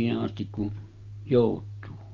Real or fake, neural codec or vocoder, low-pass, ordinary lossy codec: real; none; 7.2 kHz; Opus, 24 kbps